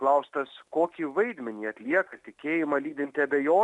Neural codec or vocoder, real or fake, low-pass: none; real; 10.8 kHz